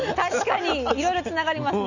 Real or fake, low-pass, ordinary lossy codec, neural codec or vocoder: real; 7.2 kHz; none; none